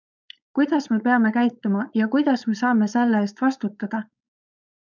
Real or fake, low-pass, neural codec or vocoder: fake; 7.2 kHz; codec, 16 kHz, 4.8 kbps, FACodec